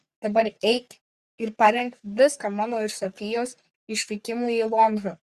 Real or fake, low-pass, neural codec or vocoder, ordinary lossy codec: fake; 14.4 kHz; codec, 44.1 kHz, 3.4 kbps, Pupu-Codec; Opus, 64 kbps